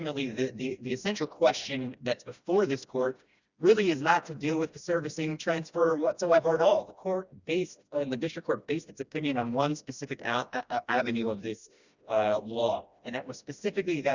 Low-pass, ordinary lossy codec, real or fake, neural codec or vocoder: 7.2 kHz; Opus, 64 kbps; fake; codec, 16 kHz, 1 kbps, FreqCodec, smaller model